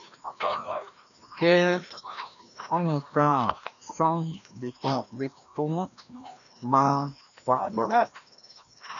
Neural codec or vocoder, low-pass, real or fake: codec, 16 kHz, 1 kbps, FreqCodec, larger model; 7.2 kHz; fake